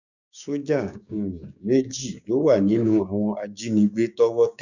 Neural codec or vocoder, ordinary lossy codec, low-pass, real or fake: codec, 16 kHz, 6 kbps, DAC; none; 7.2 kHz; fake